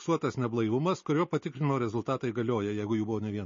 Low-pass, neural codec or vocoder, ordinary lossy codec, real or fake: 7.2 kHz; none; MP3, 32 kbps; real